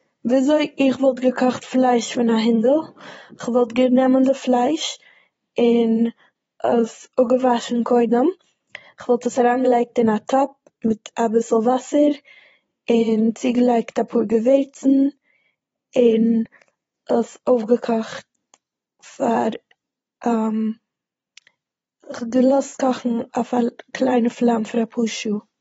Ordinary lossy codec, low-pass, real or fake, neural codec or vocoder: AAC, 24 kbps; 9.9 kHz; fake; vocoder, 22.05 kHz, 80 mel bands, Vocos